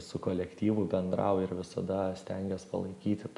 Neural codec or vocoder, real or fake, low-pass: none; real; 10.8 kHz